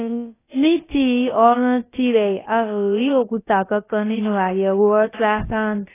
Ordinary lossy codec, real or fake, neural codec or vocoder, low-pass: AAC, 16 kbps; fake; codec, 16 kHz, about 1 kbps, DyCAST, with the encoder's durations; 3.6 kHz